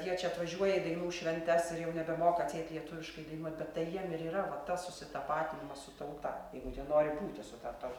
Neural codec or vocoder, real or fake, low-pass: none; real; 19.8 kHz